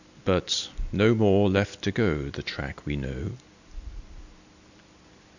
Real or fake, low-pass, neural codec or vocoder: real; 7.2 kHz; none